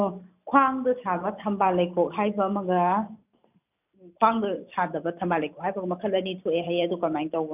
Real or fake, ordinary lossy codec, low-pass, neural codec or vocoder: real; none; 3.6 kHz; none